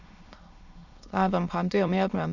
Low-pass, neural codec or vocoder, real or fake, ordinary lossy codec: 7.2 kHz; autoencoder, 22.05 kHz, a latent of 192 numbers a frame, VITS, trained on many speakers; fake; none